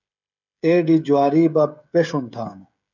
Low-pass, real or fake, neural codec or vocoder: 7.2 kHz; fake; codec, 16 kHz, 16 kbps, FreqCodec, smaller model